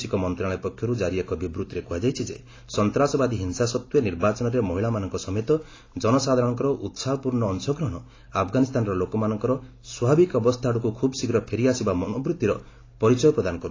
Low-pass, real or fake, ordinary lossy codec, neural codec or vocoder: 7.2 kHz; real; AAC, 32 kbps; none